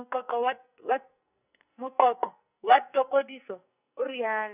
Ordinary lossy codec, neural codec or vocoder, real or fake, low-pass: none; codec, 44.1 kHz, 2.6 kbps, SNAC; fake; 3.6 kHz